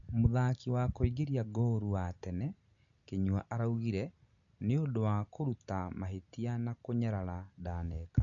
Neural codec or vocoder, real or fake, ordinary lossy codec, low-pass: none; real; MP3, 96 kbps; 7.2 kHz